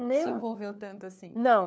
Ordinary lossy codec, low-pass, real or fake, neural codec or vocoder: none; none; fake; codec, 16 kHz, 4 kbps, FunCodec, trained on LibriTTS, 50 frames a second